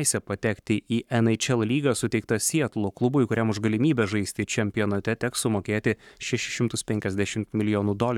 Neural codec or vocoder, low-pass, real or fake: codec, 44.1 kHz, 7.8 kbps, Pupu-Codec; 19.8 kHz; fake